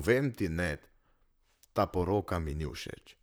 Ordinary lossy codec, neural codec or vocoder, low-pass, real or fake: none; vocoder, 44.1 kHz, 128 mel bands, Pupu-Vocoder; none; fake